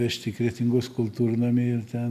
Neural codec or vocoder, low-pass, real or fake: none; 14.4 kHz; real